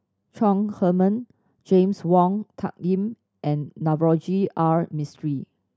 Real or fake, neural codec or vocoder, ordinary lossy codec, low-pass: real; none; none; none